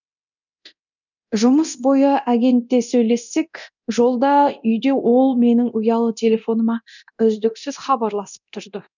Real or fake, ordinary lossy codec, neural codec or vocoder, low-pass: fake; none; codec, 24 kHz, 0.9 kbps, DualCodec; 7.2 kHz